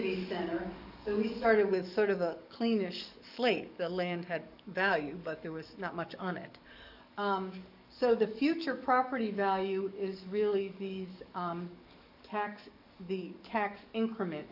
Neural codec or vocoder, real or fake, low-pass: codec, 44.1 kHz, 7.8 kbps, DAC; fake; 5.4 kHz